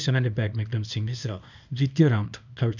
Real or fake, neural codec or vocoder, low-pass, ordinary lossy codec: fake; codec, 24 kHz, 0.9 kbps, WavTokenizer, small release; 7.2 kHz; none